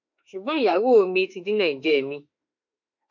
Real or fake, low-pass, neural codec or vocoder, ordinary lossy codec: fake; 7.2 kHz; autoencoder, 48 kHz, 32 numbers a frame, DAC-VAE, trained on Japanese speech; MP3, 48 kbps